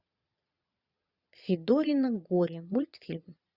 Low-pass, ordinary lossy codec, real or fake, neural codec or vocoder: 5.4 kHz; Opus, 64 kbps; fake; vocoder, 22.05 kHz, 80 mel bands, Vocos